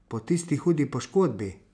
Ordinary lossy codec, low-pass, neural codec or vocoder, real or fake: none; 9.9 kHz; none; real